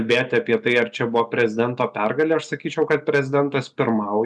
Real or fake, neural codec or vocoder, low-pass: real; none; 10.8 kHz